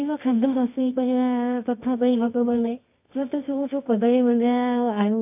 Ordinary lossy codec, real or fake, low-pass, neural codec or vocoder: AAC, 32 kbps; fake; 3.6 kHz; codec, 24 kHz, 0.9 kbps, WavTokenizer, medium music audio release